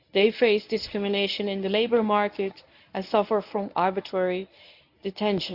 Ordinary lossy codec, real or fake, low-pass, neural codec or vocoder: AAC, 48 kbps; fake; 5.4 kHz; codec, 24 kHz, 0.9 kbps, WavTokenizer, medium speech release version 1